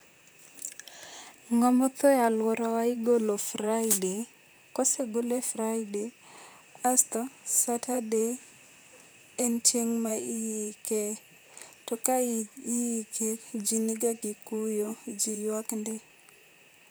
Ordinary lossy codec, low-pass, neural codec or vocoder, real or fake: none; none; vocoder, 44.1 kHz, 128 mel bands, Pupu-Vocoder; fake